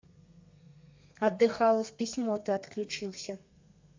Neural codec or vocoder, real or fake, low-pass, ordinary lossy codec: codec, 32 kHz, 1.9 kbps, SNAC; fake; 7.2 kHz; MP3, 64 kbps